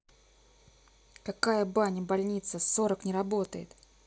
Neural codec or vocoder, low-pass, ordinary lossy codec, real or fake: none; none; none; real